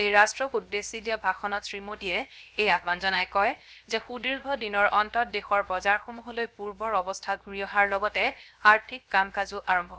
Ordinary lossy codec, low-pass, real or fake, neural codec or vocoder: none; none; fake; codec, 16 kHz, 0.7 kbps, FocalCodec